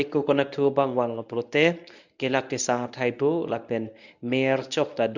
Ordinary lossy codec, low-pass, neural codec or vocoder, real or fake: none; 7.2 kHz; codec, 24 kHz, 0.9 kbps, WavTokenizer, medium speech release version 1; fake